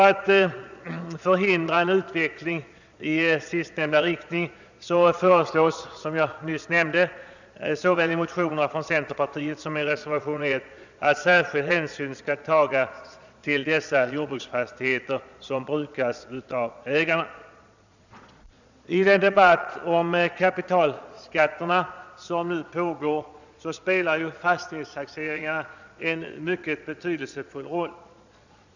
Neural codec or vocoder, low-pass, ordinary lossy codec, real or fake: vocoder, 22.05 kHz, 80 mel bands, Vocos; 7.2 kHz; none; fake